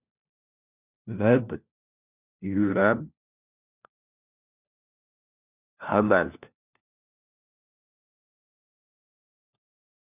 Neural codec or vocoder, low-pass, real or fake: codec, 16 kHz, 1 kbps, FunCodec, trained on LibriTTS, 50 frames a second; 3.6 kHz; fake